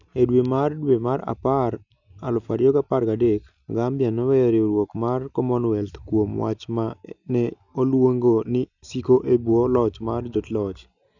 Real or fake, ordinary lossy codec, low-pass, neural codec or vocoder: real; none; 7.2 kHz; none